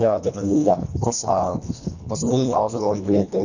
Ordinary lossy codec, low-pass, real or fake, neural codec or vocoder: none; 7.2 kHz; fake; codec, 24 kHz, 1.5 kbps, HILCodec